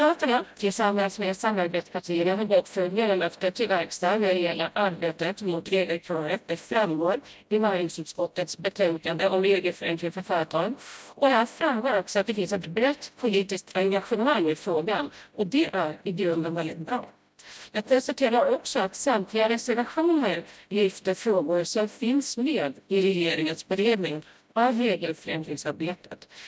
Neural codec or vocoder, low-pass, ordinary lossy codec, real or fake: codec, 16 kHz, 0.5 kbps, FreqCodec, smaller model; none; none; fake